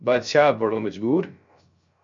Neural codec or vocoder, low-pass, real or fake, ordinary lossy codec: codec, 16 kHz, 0.3 kbps, FocalCodec; 7.2 kHz; fake; MP3, 48 kbps